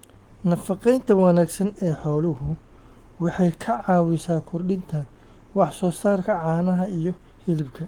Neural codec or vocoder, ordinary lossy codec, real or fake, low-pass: codec, 44.1 kHz, 7.8 kbps, Pupu-Codec; Opus, 24 kbps; fake; 19.8 kHz